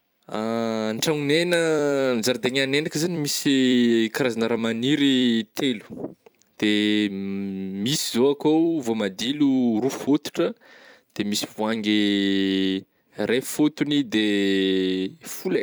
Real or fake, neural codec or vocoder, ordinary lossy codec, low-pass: fake; vocoder, 44.1 kHz, 128 mel bands every 256 samples, BigVGAN v2; none; none